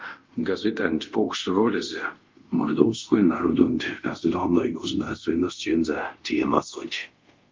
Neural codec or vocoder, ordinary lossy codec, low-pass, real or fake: codec, 24 kHz, 0.5 kbps, DualCodec; Opus, 32 kbps; 7.2 kHz; fake